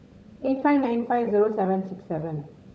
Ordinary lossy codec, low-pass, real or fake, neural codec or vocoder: none; none; fake; codec, 16 kHz, 16 kbps, FunCodec, trained on LibriTTS, 50 frames a second